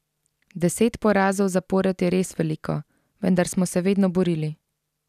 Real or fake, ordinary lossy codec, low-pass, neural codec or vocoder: real; none; 14.4 kHz; none